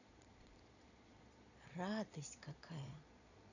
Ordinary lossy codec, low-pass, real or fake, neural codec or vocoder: none; 7.2 kHz; fake; vocoder, 22.05 kHz, 80 mel bands, Vocos